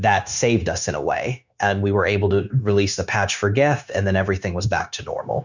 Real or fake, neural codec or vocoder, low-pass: fake; codec, 16 kHz, 0.9 kbps, LongCat-Audio-Codec; 7.2 kHz